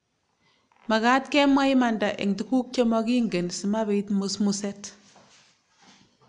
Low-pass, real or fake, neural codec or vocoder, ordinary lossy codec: 9.9 kHz; real; none; none